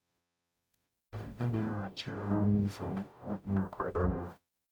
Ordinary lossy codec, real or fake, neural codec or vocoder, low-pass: none; fake; codec, 44.1 kHz, 0.9 kbps, DAC; none